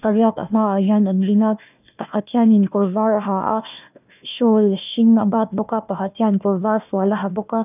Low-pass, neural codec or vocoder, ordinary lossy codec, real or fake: 3.6 kHz; codec, 16 kHz, 1 kbps, FunCodec, trained on Chinese and English, 50 frames a second; none; fake